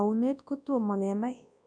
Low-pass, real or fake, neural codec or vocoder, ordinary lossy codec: 9.9 kHz; fake; codec, 24 kHz, 0.9 kbps, WavTokenizer, large speech release; none